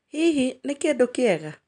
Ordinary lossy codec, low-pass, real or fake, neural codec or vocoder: none; 9.9 kHz; real; none